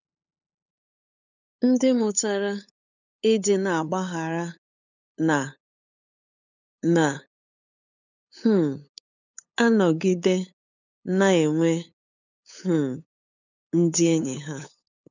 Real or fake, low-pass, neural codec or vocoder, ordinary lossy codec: fake; 7.2 kHz; codec, 16 kHz, 8 kbps, FunCodec, trained on LibriTTS, 25 frames a second; none